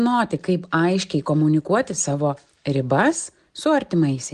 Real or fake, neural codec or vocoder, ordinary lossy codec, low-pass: real; none; Opus, 24 kbps; 10.8 kHz